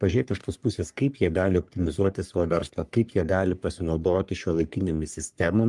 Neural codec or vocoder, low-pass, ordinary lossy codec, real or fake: codec, 44.1 kHz, 3.4 kbps, Pupu-Codec; 10.8 kHz; Opus, 32 kbps; fake